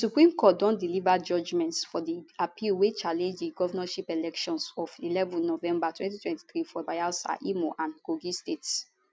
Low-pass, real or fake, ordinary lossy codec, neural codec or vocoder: none; real; none; none